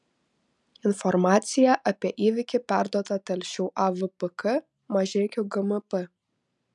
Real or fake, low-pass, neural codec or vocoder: real; 10.8 kHz; none